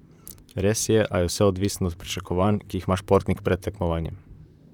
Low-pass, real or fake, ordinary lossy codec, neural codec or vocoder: 19.8 kHz; fake; none; vocoder, 44.1 kHz, 128 mel bands, Pupu-Vocoder